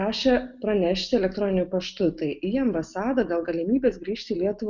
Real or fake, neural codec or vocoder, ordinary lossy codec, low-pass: real; none; Opus, 64 kbps; 7.2 kHz